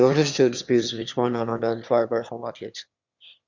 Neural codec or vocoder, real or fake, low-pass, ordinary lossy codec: autoencoder, 22.05 kHz, a latent of 192 numbers a frame, VITS, trained on one speaker; fake; 7.2 kHz; Opus, 64 kbps